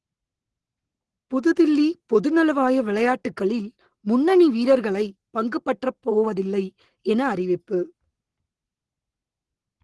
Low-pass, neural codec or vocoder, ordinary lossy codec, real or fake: 10.8 kHz; codec, 44.1 kHz, 7.8 kbps, Pupu-Codec; Opus, 16 kbps; fake